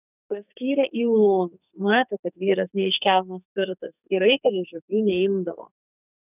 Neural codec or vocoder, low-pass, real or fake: codec, 44.1 kHz, 2.6 kbps, SNAC; 3.6 kHz; fake